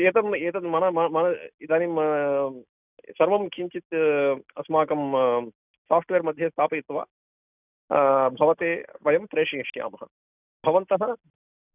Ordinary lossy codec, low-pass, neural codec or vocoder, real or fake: none; 3.6 kHz; none; real